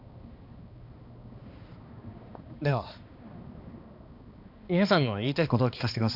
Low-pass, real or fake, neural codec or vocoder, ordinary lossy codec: 5.4 kHz; fake; codec, 16 kHz, 2 kbps, X-Codec, HuBERT features, trained on balanced general audio; none